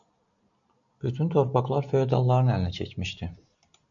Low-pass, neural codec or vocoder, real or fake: 7.2 kHz; none; real